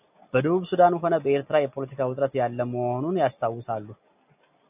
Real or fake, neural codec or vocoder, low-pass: real; none; 3.6 kHz